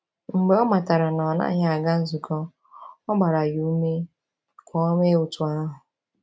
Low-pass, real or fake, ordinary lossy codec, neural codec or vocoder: none; real; none; none